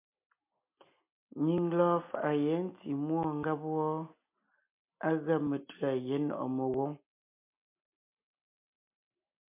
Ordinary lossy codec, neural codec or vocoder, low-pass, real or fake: MP3, 32 kbps; none; 3.6 kHz; real